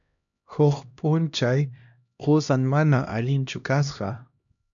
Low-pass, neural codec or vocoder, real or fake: 7.2 kHz; codec, 16 kHz, 1 kbps, X-Codec, HuBERT features, trained on LibriSpeech; fake